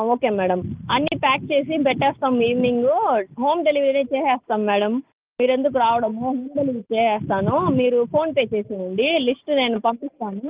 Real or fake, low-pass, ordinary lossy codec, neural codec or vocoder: real; 3.6 kHz; Opus, 32 kbps; none